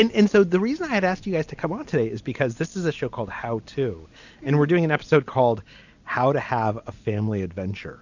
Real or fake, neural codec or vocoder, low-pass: real; none; 7.2 kHz